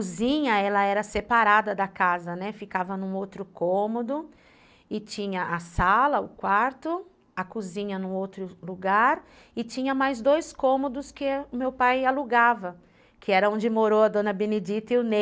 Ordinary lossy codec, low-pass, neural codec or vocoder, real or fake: none; none; none; real